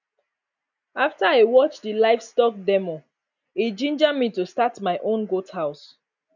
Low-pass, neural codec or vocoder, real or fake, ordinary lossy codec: 7.2 kHz; none; real; none